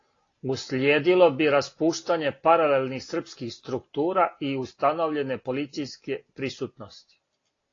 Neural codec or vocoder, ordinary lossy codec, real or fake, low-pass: none; AAC, 32 kbps; real; 7.2 kHz